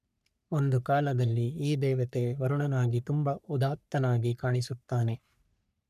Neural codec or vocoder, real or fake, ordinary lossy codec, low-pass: codec, 44.1 kHz, 3.4 kbps, Pupu-Codec; fake; none; 14.4 kHz